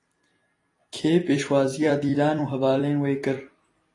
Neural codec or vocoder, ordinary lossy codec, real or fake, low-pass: vocoder, 44.1 kHz, 128 mel bands every 256 samples, BigVGAN v2; AAC, 32 kbps; fake; 10.8 kHz